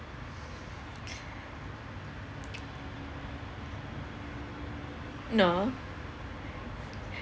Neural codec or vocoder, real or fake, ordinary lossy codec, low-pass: none; real; none; none